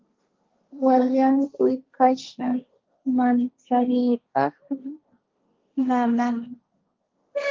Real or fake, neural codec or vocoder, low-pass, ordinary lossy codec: fake; codec, 16 kHz, 1.1 kbps, Voila-Tokenizer; 7.2 kHz; Opus, 24 kbps